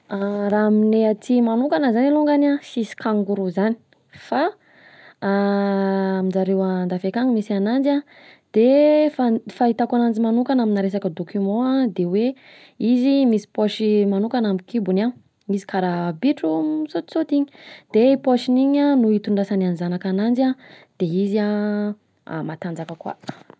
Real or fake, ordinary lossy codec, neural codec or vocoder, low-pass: real; none; none; none